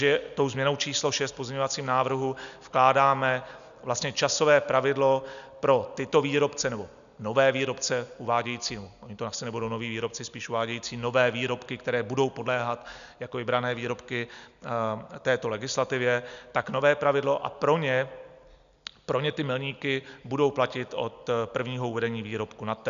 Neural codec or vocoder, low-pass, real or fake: none; 7.2 kHz; real